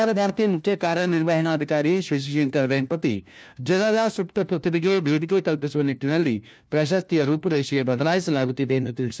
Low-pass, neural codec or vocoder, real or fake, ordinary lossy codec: none; codec, 16 kHz, 1 kbps, FunCodec, trained on LibriTTS, 50 frames a second; fake; none